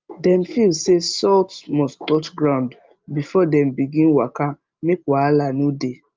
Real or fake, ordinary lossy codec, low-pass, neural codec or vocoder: real; Opus, 24 kbps; 7.2 kHz; none